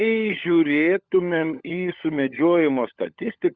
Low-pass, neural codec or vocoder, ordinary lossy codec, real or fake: 7.2 kHz; codec, 16 kHz, 16 kbps, FunCodec, trained on LibriTTS, 50 frames a second; Opus, 64 kbps; fake